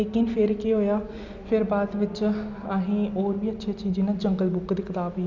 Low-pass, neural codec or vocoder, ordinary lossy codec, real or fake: 7.2 kHz; none; none; real